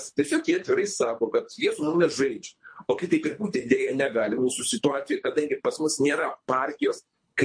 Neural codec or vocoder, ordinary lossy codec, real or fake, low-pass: codec, 24 kHz, 3 kbps, HILCodec; MP3, 48 kbps; fake; 9.9 kHz